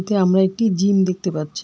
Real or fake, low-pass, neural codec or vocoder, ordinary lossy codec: real; none; none; none